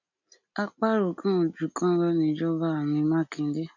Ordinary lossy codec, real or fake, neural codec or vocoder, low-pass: none; real; none; 7.2 kHz